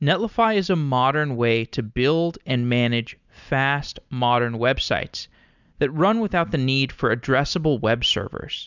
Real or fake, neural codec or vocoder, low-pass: real; none; 7.2 kHz